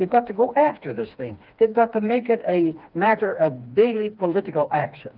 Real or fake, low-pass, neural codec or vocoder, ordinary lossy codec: fake; 5.4 kHz; codec, 16 kHz, 2 kbps, FreqCodec, smaller model; Opus, 24 kbps